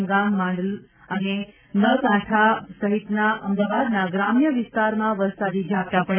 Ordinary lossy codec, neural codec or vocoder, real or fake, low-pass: none; none; real; 3.6 kHz